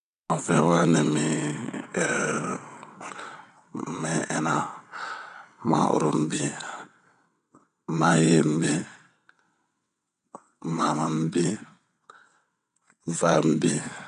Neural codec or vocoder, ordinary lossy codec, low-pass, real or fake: vocoder, 22.05 kHz, 80 mel bands, WaveNeXt; none; 9.9 kHz; fake